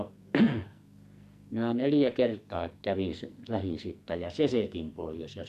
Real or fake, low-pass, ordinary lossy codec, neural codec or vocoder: fake; 14.4 kHz; none; codec, 44.1 kHz, 2.6 kbps, SNAC